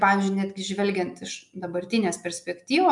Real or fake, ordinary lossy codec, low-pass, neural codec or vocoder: real; MP3, 96 kbps; 10.8 kHz; none